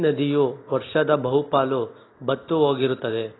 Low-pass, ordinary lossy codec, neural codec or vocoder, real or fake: 7.2 kHz; AAC, 16 kbps; none; real